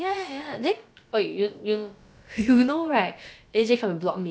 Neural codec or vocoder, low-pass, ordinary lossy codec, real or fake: codec, 16 kHz, about 1 kbps, DyCAST, with the encoder's durations; none; none; fake